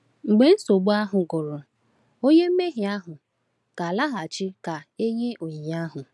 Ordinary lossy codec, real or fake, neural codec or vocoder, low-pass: none; real; none; none